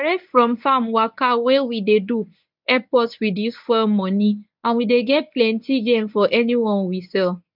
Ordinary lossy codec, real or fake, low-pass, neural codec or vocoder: none; fake; 5.4 kHz; codec, 24 kHz, 0.9 kbps, WavTokenizer, medium speech release version 1